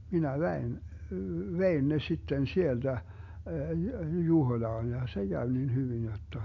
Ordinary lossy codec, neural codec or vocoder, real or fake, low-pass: MP3, 48 kbps; none; real; 7.2 kHz